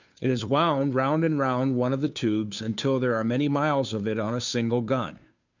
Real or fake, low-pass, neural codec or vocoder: fake; 7.2 kHz; codec, 16 kHz, 2 kbps, FunCodec, trained on Chinese and English, 25 frames a second